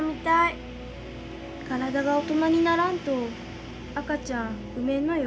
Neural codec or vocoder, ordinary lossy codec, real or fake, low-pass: none; none; real; none